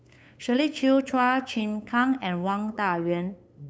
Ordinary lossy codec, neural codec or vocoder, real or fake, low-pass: none; codec, 16 kHz, 8 kbps, FunCodec, trained on LibriTTS, 25 frames a second; fake; none